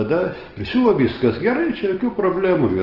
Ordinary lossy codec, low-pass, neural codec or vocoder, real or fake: Opus, 16 kbps; 5.4 kHz; none; real